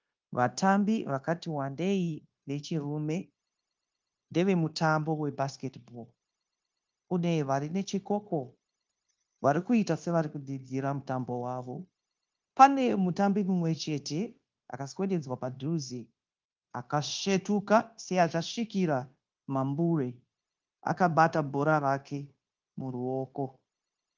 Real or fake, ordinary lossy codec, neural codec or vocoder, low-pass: fake; Opus, 32 kbps; codec, 16 kHz, 0.9 kbps, LongCat-Audio-Codec; 7.2 kHz